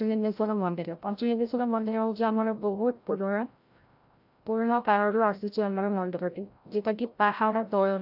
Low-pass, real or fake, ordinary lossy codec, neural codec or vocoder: 5.4 kHz; fake; AAC, 48 kbps; codec, 16 kHz, 0.5 kbps, FreqCodec, larger model